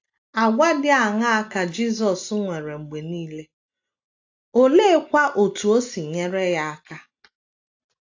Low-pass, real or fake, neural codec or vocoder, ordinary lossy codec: 7.2 kHz; real; none; AAC, 48 kbps